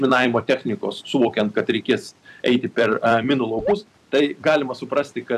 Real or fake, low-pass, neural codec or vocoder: fake; 14.4 kHz; vocoder, 44.1 kHz, 128 mel bands every 256 samples, BigVGAN v2